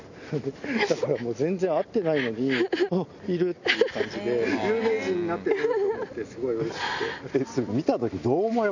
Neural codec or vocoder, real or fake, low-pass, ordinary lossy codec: none; real; 7.2 kHz; none